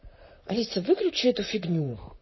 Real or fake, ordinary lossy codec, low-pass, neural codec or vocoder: fake; MP3, 24 kbps; 7.2 kHz; vocoder, 44.1 kHz, 128 mel bands, Pupu-Vocoder